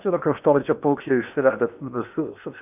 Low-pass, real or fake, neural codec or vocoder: 3.6 kHz; fake; codec, 16 kHz in and 24 kHz out, 0.8 kbps, FocalCodec, streaming, 65536 codes